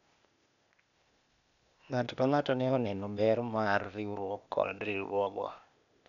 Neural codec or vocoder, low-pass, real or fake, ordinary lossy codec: codec, 16 kHz, 0.8 kbps, ZipCodec; 7.2 kHz; fake; none